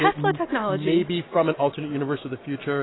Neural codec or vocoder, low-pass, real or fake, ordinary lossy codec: none; 7.2 kHz; real; AAC, 16 kbps